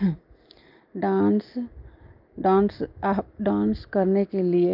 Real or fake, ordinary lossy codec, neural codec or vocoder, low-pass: real; Opus, 24 kbps; none; 5.4 kHz